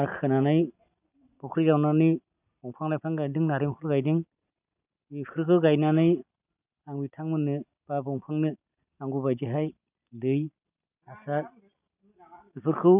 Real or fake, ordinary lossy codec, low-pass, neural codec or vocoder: real; none; 3.6 kHz; none